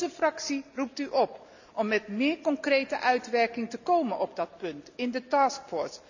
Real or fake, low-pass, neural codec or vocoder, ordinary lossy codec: real; 7.2 kHz; none; none